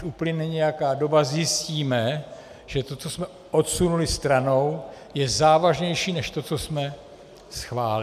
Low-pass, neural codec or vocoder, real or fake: 14.4 kHz; none; real